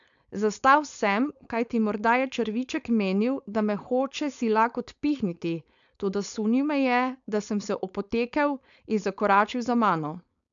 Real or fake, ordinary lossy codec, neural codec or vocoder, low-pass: fake; none; codec, 16 kHz, 4.8 kbps, FACodec; 7.2 kHz